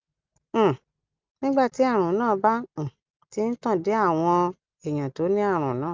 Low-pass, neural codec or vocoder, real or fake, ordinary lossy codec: 7.2 kHz; none; real; Opus, 24 kbps